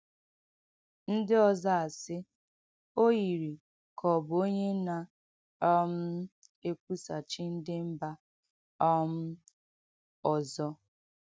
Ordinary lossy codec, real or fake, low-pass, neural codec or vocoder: none; real; none; none